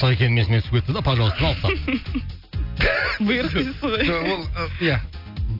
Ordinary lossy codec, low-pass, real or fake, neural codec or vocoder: none; 5.4 kHz; real; none